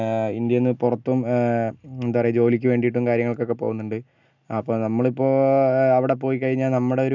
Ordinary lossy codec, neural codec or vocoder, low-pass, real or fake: none; none; 7.2 kHz; real